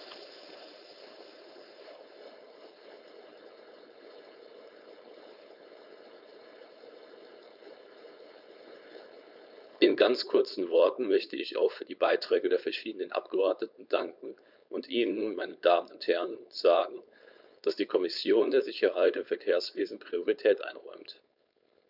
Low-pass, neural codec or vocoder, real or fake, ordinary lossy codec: 5.4 kHz; codec, 16 kHz, 4.8 kbps, FACodec; fake; none